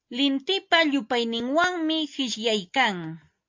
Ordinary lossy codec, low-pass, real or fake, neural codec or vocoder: MP3, 48 kbps; 7.2 kHz; real; none